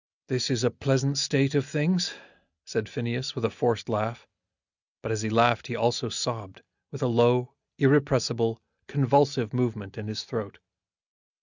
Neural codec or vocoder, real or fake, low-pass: none; real; 7.2 kHz